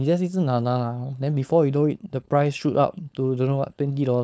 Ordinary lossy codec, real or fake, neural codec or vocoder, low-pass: none; fake; codec, 16 kHz, 4.8 kbps, FACodec; none